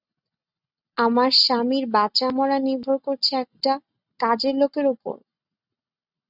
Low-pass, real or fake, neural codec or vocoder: 5.4 kHz; real; none